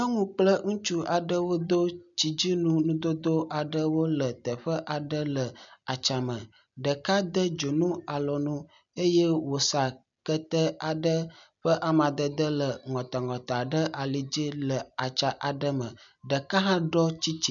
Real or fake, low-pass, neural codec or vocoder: real; 7.2 kHz; none